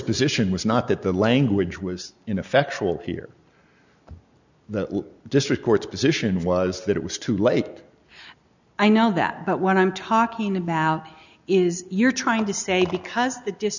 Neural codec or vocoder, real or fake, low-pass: none; real; 7.2 kHz